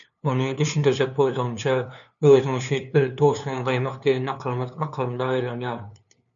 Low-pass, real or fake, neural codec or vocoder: 7.2 kHz; fake; codec, 16 kHz, 2 kbps, FunCodec, trained on LibriTTS, 25 frames a second